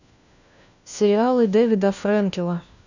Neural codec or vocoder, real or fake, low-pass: codec, 16 kHz, 1 kbps, FunCodec, trained on LibriTTS, 50 frames a second; fake; 7.2 kHz